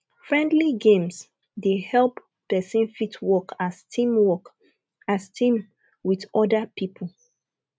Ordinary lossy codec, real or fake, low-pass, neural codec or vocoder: none; real; none; none